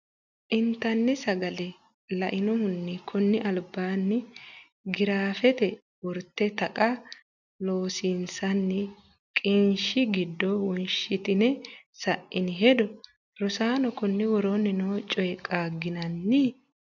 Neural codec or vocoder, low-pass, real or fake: none; 7.2 kHz; real